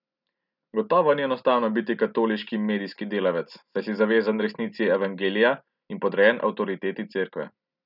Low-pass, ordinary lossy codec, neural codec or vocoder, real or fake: 5.4 kHz; none; none; real